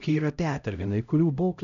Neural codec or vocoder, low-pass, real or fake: codec, 16 kHz, 0.5 kbps, X-Codec, HuBERT features, trained on LibriSpeech; 7.2 kHz; fake